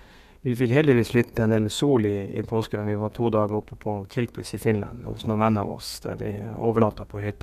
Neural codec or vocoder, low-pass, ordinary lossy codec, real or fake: codec, 32 kHz, 1.9 kbps, SNAC; 14.4 kHz; none; fake